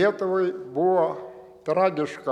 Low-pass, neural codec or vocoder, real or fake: 19.8 kHz; none; real